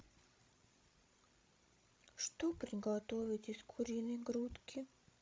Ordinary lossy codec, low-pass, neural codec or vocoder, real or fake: none; none; codec, 16 kHz, 16 kbps, FreqCodec, larger model; fake